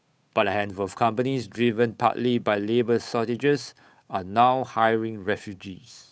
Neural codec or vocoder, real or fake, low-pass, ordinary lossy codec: codec, 16 kHz, 8 kbps, FunCodec, trained on Chinese and English, 25 frames a second; fake; none; none